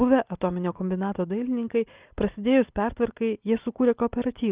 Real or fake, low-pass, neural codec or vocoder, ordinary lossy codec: real; 3.6 kHz; none; Opus, 32 kbps